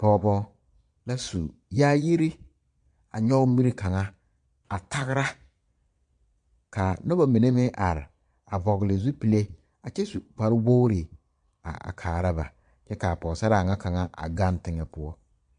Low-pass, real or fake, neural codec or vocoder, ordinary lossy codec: 9.9 kHz; fake; vocoder, 22.05 kHz, 80 mel bands, Vocos; MP3, 64 kbps